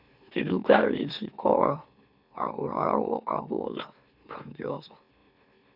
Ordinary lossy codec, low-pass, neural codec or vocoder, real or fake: none; 5.4 kHz; autoencoder, 44.1 kHz, a latent of 192 numbers a frame, MeloTTS; fake